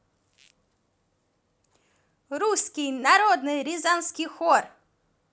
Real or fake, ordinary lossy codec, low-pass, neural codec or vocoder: real; none; none; none